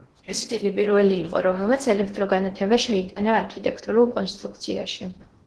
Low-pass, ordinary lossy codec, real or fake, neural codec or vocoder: 10.8 kHz; Opus, 16 kbps; fake; codec, 16 kHz in and 24 kHz out, 0.8 kbps, FocalCodec, streaming, 65536 codes